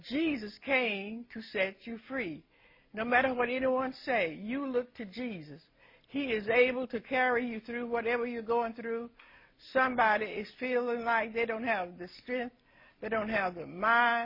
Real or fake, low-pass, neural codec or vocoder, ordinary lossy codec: real; 5.4 kHz; none; MP3, 24 kbps